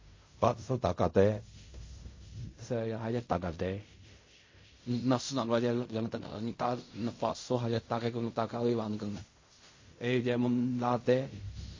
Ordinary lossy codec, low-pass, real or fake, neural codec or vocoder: MP3, 32 kbps; 7.2 kHz; fake; codec, 16 kHz in and 24 kHz out, 0.4 kbps, LongCat-Audio-Codec, fine tuned four codebook decoder